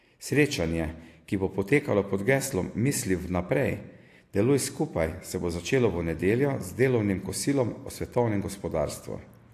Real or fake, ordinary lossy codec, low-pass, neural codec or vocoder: real; AAC, 64 kbps; 14.4 kHz; none